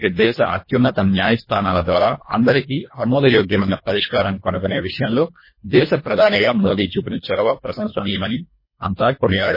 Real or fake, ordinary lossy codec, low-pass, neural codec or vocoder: fake; MP3, 24 kbps; 5.4 kHz; codec, 24 kHz, 1.5 kbps, HILCodec